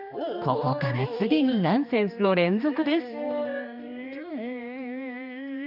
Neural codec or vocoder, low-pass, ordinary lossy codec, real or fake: codec, 16 kHz, 2 kbps, X-Codec, HuBERT features, trained on general audio; 5.4 kHz; none; fake